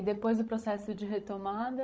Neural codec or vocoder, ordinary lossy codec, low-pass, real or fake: codec, 16 kHz, 16 kbps, FreqCodec, larger model; none; none; fake